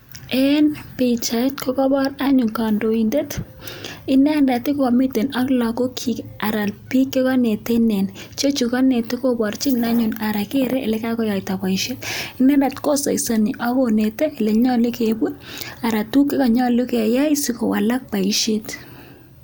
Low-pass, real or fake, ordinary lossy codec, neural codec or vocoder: none; real; none; none